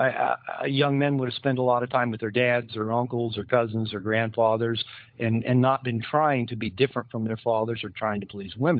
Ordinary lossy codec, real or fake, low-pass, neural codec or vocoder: MP3, 48 kbps; fake; 5.4 kHz; codec, 16 kHz, 16 kbps, FunCodec, trained on LibriTTS, 50 frames a second